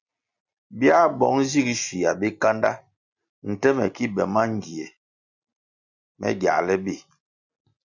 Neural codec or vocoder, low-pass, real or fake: none; 7.2 kHz; real